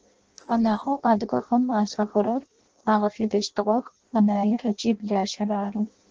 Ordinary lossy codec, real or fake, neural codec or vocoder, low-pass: Opus, 16 kbps; fake; codec, 16 kHz in and 24 kHz out, 0.6 kbps, FireRedTTS-2 codec; 7.2 kHz